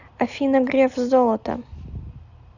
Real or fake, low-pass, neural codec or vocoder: real; 7.2 kHz; none